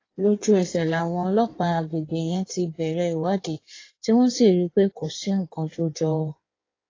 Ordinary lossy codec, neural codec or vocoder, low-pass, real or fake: AAC, 32 kbps; codec, 16 kHz in and 24 kHz out, 1.1 kbps, FireRedTTS-2 codec; 7.2 kHz; fake